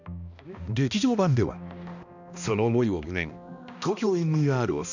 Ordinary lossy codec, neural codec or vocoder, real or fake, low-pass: none; codec, 16 kHz, 1 kbps, X-Codec, HuBERT features, trained on balanced general audio; fake; 7.2 kHz